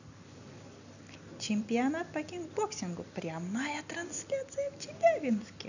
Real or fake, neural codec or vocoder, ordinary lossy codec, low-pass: real; none; none; 7.2 kHz